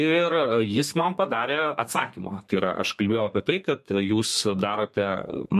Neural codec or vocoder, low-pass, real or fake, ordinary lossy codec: codec, 32 kHz, 1.9 kbps, SNAC; 14.4 kHz; fake; MP3, 64 kbps